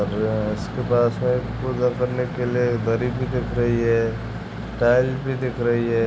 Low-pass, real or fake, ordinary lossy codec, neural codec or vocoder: none; real; none; none